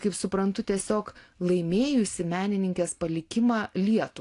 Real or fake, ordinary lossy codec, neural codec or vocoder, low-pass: real; AAC, 48 kbps; none; 10.8 kHz